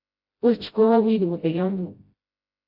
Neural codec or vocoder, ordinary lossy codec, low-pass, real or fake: codec, 16 kHz, 0.5 kbps, FreqCodec, smaller model; AAC, 32 kbps; 5.4 kHz; fake